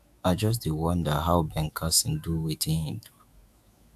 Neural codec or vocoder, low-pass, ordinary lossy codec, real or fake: autoencoder, 48 kHz, 128 numbers a frame, DAC-VAE, trained on Japanese speech; 14.4 kHz; AAC, 96 kbps; fake